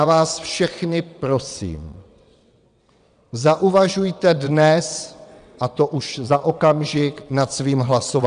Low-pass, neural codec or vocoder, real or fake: 9.9 kHz; vocoder, 22.05 kHz, 80 mel bands, WaveNeXt; fake